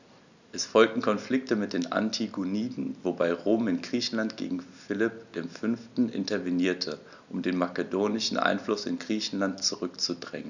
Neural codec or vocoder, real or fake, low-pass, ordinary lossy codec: none; real; 7.2 kHz; none